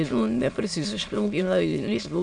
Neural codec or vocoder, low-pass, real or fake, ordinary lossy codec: autoencoder, 22.05 kHz, a latent of 192 numbers a frame, VITS, trained on many speakers; 9.9 kHz; fake; MP3, 64 kbps